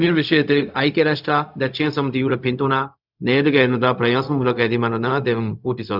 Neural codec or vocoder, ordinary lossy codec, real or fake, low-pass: codec, 16 kHz, 0.4 kbps, LongCat-Audio-Codec; none; fake; 5.4 kHz